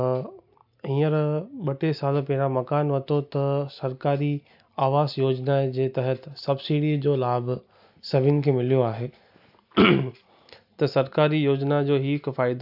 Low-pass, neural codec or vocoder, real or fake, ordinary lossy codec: 5.4 kHz; none; real; AAC, 48 kbps